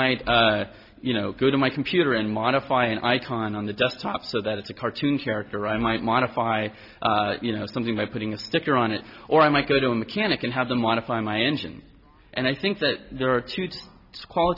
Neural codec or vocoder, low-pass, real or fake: none; 5.4 kHz; real